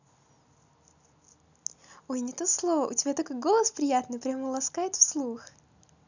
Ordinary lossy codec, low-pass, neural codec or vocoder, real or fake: none; 7.2 kHz; none; real